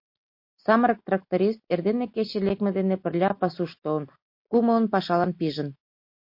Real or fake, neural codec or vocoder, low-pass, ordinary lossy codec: real; none; 5.4 kHz; MP3, 32 kbps